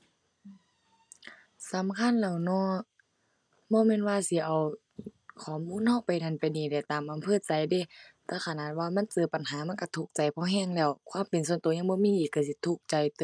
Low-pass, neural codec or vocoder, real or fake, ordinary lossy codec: 9.9 kHz; none; real; none